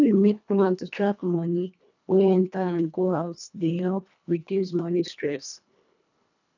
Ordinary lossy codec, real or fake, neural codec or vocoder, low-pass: none; fake; codec, 24 kHz, 1.5 kbps, HILCodec; 7.2 kHz